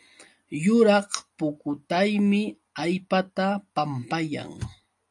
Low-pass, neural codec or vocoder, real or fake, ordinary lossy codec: 10.8 kHz; none; real; AAC, 64 kbps